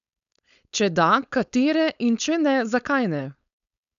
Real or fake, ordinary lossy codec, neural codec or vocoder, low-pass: fake; none; codec, 16 kHz, 4.8 kbps, FACodec; 7.2 kHz